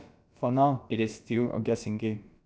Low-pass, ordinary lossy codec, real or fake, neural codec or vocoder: none; none; fake; codec, 16 kHz, about 1 kbps, DyCAST, with the encoder's durations